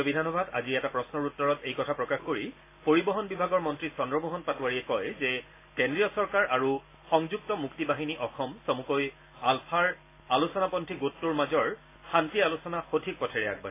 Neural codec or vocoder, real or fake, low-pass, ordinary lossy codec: none; real; 3.6 kHz; AAC, 24 kbps